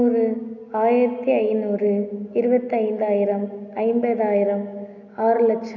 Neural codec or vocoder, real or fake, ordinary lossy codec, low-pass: none; real; none; 7.2 kHz